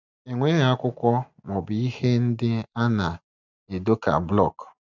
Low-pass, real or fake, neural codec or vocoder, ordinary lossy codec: 7.2 kHz; real; none; none